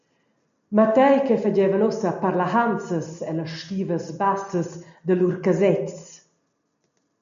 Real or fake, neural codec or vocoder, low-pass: real; none; 7.2 kHz